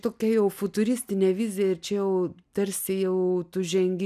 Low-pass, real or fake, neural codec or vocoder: 14.4 kHz; real; none